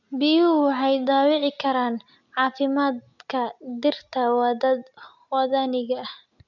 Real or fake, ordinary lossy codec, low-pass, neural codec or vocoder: real; none; 7.2 kHz; none